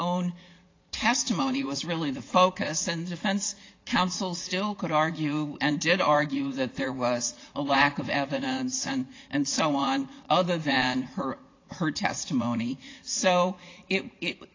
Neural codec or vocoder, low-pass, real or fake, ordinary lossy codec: vocoder, 44.1 kHz, 80 mel bands, Vocos; 7.2 kHz; fake; AAC, 32 kbps